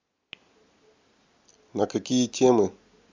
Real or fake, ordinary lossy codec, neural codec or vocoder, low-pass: real; MP3, 64 kbps; none; 7.2 kHz